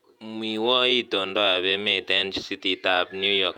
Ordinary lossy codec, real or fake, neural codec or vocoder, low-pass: none; fake; vocoder, 48 kHz, 128 mel bands, Vocos; 19.8 kHz